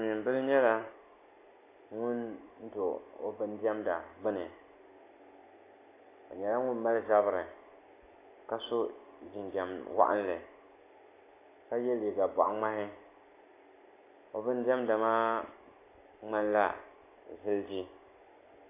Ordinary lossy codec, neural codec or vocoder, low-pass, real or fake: AAC, 24 kbps; none; 3.6 kHz; real